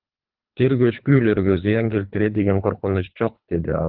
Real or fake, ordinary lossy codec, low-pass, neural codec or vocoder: fake; Opus, 32 kbps; 5.4 kHz; codec, 24 kHz, 3 kbps, HILCodec